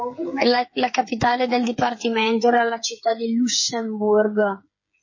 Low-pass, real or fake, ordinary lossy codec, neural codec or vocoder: 7.2 kHz; fake; MP3, 32 kbps; codec, 16 kHz, 8 kbps, FreqCodec, smaller model